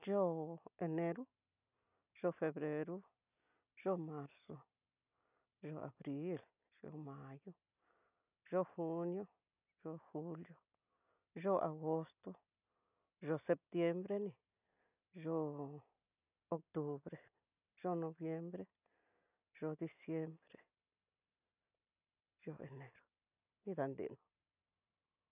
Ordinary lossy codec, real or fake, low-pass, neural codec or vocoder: none; real; 3.6 kHz; none